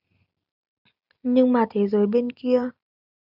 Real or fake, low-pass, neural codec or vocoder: real; 5.4 kHz; none